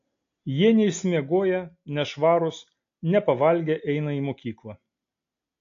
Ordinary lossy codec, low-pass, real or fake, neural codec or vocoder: AAC, 48 kbps; 7.2 kHz; real; none